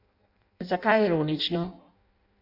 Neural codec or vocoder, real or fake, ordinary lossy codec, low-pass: codec, 16 kHz in and 24 kHz out, 0.6 kbps, FireRedTTS-2 codec; fake; none; 5.4 kHz